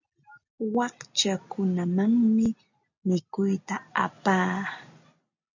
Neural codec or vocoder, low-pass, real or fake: none; 7.2 kHz; real